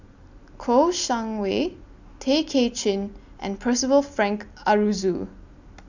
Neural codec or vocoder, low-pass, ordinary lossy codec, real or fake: none; 7.2 kHz; none; real